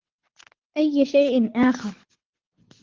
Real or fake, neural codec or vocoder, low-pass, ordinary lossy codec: fake; codec, 24 kHz, 6 kbps, HILCodec; 7.2 kHz; Opus, 16 kbps